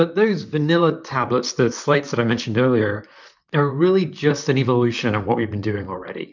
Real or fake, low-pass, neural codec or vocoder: fake; 7.2 kHz; vocoder, 44.1 kHz, 128 mel bands, Pupu-Vocoder